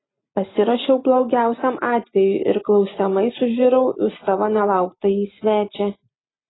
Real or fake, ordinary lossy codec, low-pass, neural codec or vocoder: real; AAC, 16 kbps; 7.2 kHz; none